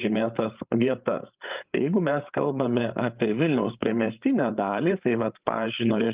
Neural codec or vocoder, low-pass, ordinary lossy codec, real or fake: codec, 16 kHz, 8 kbps, FreqCodec, larger model; 3.6 kHz; Opus, 32 kbps; fake